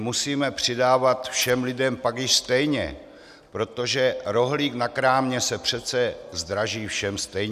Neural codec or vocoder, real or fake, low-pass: none; real; 14.4 kHz